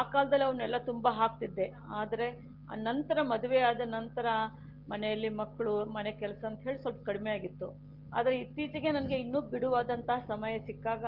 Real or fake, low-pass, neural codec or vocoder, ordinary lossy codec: real; 5.4 kHz; none; Opus, 16 kbps